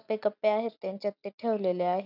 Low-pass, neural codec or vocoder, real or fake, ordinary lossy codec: 5.4 kHz; none; real; none